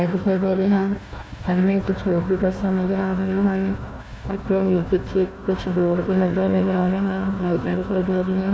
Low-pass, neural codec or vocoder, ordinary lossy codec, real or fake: none; codec, 16 kHz, 1 kbps, FunCodec, trained on Chinese and English, 50 frames a second; none; fake